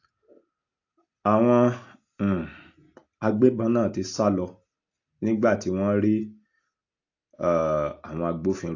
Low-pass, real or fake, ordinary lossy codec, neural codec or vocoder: 7.2 kHz; real; AAC, 48 kbps; none